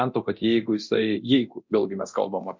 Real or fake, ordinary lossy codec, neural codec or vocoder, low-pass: fake; MP3, 48 kbps; codec, 24 kHz, 0.9 kbps, DualCodec; 7.2 kHz